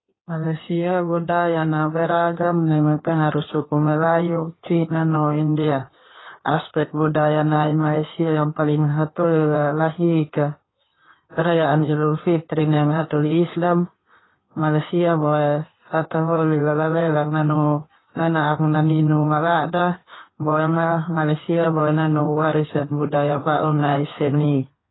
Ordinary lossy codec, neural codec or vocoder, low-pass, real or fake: AAC, 16 kbps; codec, 16 kHz in and 24 kHz out, 1.1 kbps, FireRedTTS-2 codec; 7.2 kHz; fake